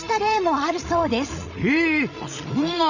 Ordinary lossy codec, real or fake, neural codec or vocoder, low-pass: none; fake; codec, 16 kHz, 16 kbps, FreqCodec, larger model; 7.2 kHz